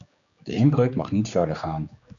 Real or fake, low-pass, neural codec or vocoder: fake; 7.2 kHz; codec, 16 kHz, 2 kbps, X-Codec, HuBERT features, trained on general audio